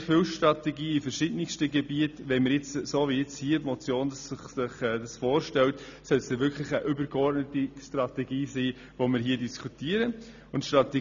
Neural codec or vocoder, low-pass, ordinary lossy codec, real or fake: none; 7.2 kHz; none; real